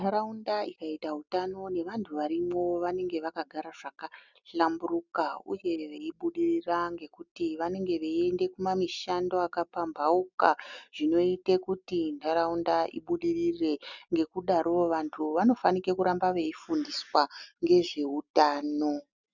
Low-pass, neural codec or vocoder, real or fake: 7.2 kHz; none; real